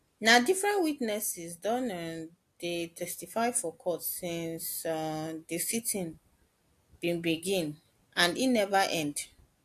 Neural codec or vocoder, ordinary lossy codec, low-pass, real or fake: none; AAC, 64 kbps; 14.4 kHz; real